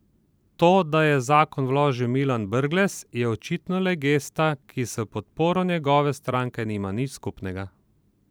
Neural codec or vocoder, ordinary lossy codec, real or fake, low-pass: vocoder, 44.1 kHz, 128 mel bands every 512 samples, BigVGAN v2; none; fake; none